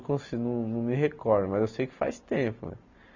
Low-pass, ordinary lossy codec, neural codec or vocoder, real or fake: 7.2 kHz; none; none; real